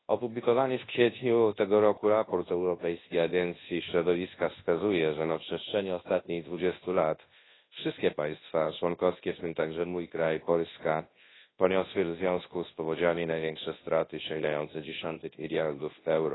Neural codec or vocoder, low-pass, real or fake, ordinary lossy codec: codec, 24 kHz, 0.9 kbps, WavTokenizer, large speech release; 7.2 kHz; fake; AAC, 16 kbps